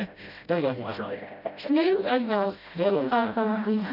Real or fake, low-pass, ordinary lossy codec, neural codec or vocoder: fake; 5.4 kHz; none; codec, 16 kHz, 0.5 kbps, FreqCodec, smaller model